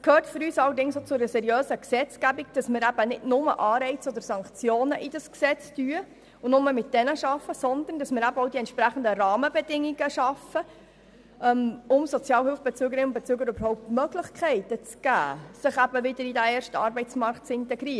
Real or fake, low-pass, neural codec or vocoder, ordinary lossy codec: real; none; none; none